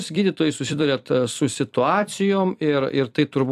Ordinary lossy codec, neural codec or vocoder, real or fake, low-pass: AAC, 96 kbps; vocoder, 44.1 kHz, 128 mel bands every 256 samples, BigVGAN v2; fake; 14.4 kHz